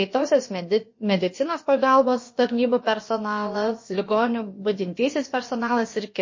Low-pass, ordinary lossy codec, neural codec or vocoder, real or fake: 7.2 kHz; MP3, 32 kbps; codec, 16 kHz, about 1 kbps, DyCAST, with the encoder's durations; fake